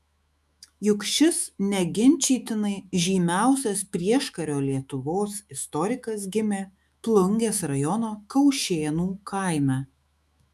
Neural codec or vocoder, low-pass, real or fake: autoencoder, 48 kHz, 128 numbers a frame, DAC-VAE, trained on Japanese speech; 14.4 kHz; fake